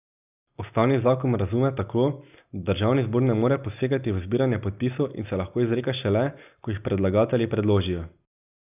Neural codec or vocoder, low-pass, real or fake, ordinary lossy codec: none; 3.6 kHz; real; none